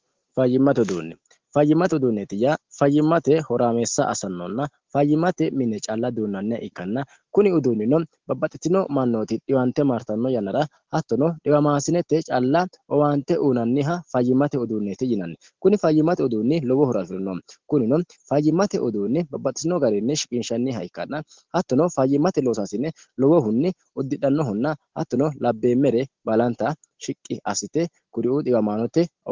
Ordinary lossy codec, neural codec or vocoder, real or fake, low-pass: Opus, 16 kbps; none; real; 7.2 kHz